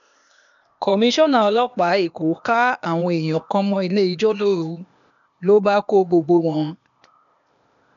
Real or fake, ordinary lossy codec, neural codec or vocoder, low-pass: fake; none; codec, 16 kHz, 0.8 kbps, ZipCodec; 7.2 kHz